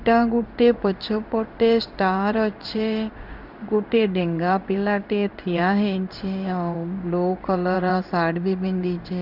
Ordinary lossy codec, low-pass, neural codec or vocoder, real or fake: none; 5.4 kHz; codec, 16 kHz in and 24 kHz out, 1 kbps, XY-Tokenizer; fake